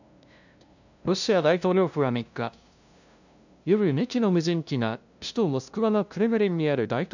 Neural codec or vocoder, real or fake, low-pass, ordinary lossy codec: codec, 16 kHz, 0.5 kbps, FunCodec, trained on LibriTTS, 25 frames a second; fake; 7.2 kHz; none